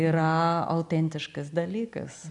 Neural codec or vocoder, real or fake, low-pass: none; real; 10.8 kHz